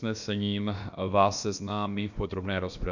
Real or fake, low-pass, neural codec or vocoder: fake; 7.2 kHz; codec, 16 kHz, about 1 kbps, DyCAST, with the encoder's durations